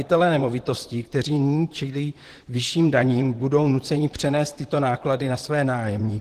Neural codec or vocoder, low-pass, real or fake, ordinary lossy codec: vocoder, 44.1 kHz, 128 mel bands, Pupu-Vocoder; 14.4 kHz; fake; Opus, 16 kbps